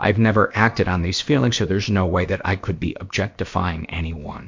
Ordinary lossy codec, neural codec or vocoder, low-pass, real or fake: MP3, 48 kbps; codec, 16 kHz, about 1 kbps, DyCAST, with the encoder's durations; 7.2 kHz; fake